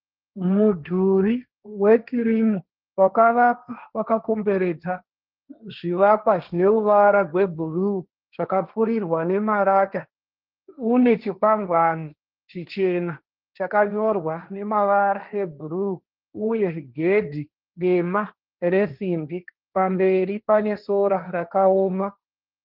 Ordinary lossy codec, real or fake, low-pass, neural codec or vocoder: Opus, 24 kbps; fake; 5.4 kHz; codec, 16 kHz, 1.1 kbps, Voila-Tokenizer